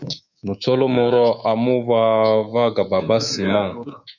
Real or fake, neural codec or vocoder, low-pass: fake; codec, 24 kHz, 3.1 kbps, DualCodec; 7.2 kHz